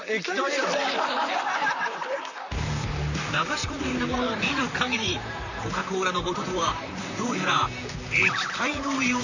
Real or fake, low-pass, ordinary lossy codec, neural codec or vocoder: fake; 7.2 kHz; none; vocoder, 44.1 kHz, 128 mel bands, Pupu-Vocoder